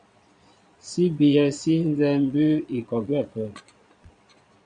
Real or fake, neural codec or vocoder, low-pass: fake; vocoder, 22.05 kHz, 80 mel bands, Vocos; 9.9 kHz